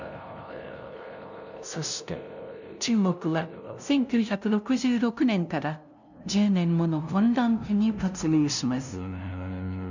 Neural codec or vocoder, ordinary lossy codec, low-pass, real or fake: codec, 16 kHz, 0.5 kbps, FunCodec, trained on LibriTTS, 25 frames a second; none; 7.2 kHz; fake